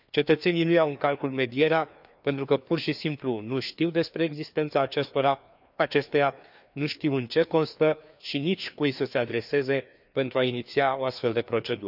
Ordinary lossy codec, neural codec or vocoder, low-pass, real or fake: none; codec, 16 kHz, 2 kbps, FreqCodec, larger model; 5.4 kHz; fake